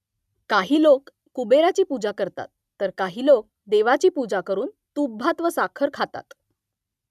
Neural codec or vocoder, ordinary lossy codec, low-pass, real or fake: none; none; 14.4 kHz; real